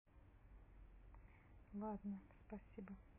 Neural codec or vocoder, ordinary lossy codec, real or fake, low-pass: none; MP3, 16 kbps; real; 3.6 kHz